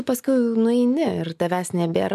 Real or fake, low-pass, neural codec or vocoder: real; 14.4 kHz; none